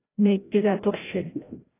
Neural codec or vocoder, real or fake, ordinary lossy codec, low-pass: codec, 16 kHz, 0.5 kbps, FreqCodec, larger model; fake; AAC, 16 kbps; 3.6 kHz